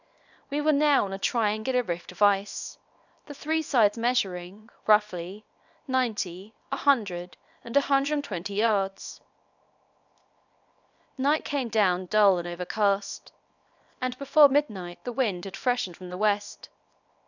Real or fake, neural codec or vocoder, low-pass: fake; codec, 24 kHz, 0.9 kbps, WavTokenizer, small release; 7.2 kHz